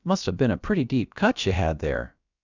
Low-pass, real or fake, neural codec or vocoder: 7.2 kHz; fake; codec, 16 kHz, about 1 kbps, DyCAST, with the encoder's durations